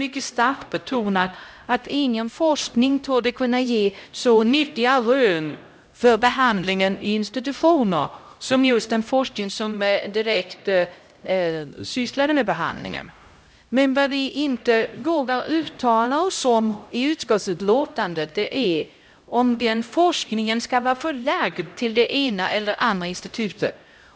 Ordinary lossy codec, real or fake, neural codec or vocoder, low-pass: none; fake; codec, 16 kHz, 0.5 kbps, X-Codec, HuBERT features, trained on LibriSpeech; none